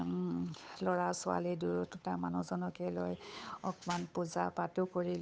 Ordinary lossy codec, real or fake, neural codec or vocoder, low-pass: none; fake; codec, 16 kHz, 8 kbps, FunCodec, trained on Chinese and English, 25 frames a second; none